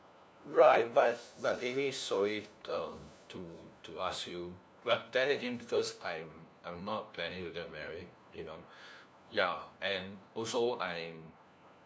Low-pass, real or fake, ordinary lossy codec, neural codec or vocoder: none; fake; none; codec, 16 kHz, 1 kbps, FunCodec, trained on LibriTTS, 50 frames a second